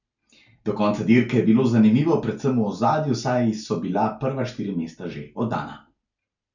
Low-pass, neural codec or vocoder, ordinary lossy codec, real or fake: 7.2 kHz; none; none; real